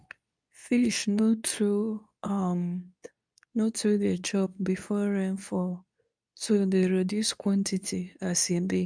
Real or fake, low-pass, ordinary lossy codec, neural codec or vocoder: fake; 9.9 kHz; none; codec, 24 kHz, 0.9 kbps, WavTokenizer, medium speech release version 2